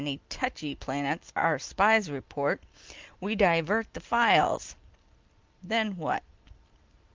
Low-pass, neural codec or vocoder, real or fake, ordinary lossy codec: 7.2 kHz; none; real; Opus, 32 kbps